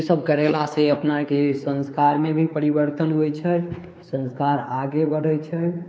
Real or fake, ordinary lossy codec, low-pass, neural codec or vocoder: fake; none; none; codec, 16 kHz, 4 kbps, X-Codec, WavLM features, trained on Multilingual LibriSpeech